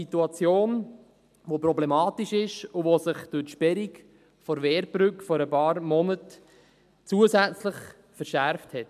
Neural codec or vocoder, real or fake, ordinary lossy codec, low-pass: none; real; MP3, 96 kbps; 14.4 kHz